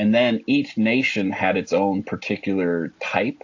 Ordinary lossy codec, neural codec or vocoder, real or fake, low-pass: AAC, 48 kbps; none; real; 7.2 kHz